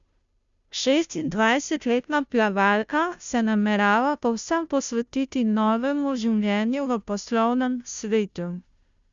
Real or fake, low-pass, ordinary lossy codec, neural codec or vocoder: fake; 7.2 kHz; Opus, 64 kbps; codec, 16 kHz, 0.5 kbps, FunCodec, trained on Chinese and English, 25 frames a second